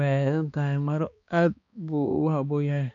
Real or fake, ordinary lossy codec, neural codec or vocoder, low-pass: fake; none; codec, 16 kHz, 2 kbps, X-Codec, HuBERT features, trained on balanced general audio; 7.2 kHz